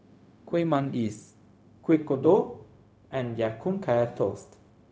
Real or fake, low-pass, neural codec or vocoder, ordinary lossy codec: fake; none; codec, 16 kHz, 0.4 kbps, LongCat-Audio-Codec; none